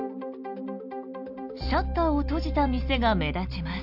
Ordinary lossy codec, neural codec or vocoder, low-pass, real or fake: none; none; 5.4 kHz; real